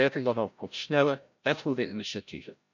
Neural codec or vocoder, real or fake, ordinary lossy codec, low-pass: codec, 16 kHz, 0.5 kbps, FreqCodec, larger model; fake; none; 7.2 kHz